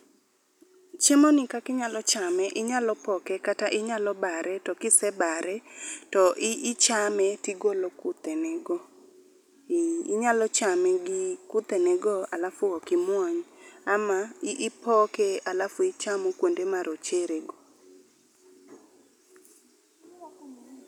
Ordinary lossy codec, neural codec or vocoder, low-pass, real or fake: none; none; 19.8 kHz; real